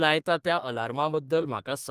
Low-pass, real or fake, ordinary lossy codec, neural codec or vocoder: 14.4 kHz; fake; Opus, 32 kbps; codec, 32 kHz, 1.9 kbps, SNAC